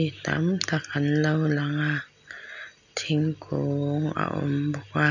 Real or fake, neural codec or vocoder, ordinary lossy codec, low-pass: real; none; none; 7.2 kHz